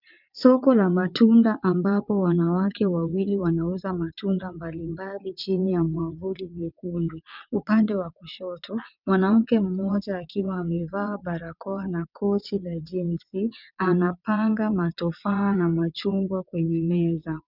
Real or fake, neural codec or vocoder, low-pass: fake; vocoder, 22.05 kHz, 80 mel bands, WaveNeXt; 5.4 kHz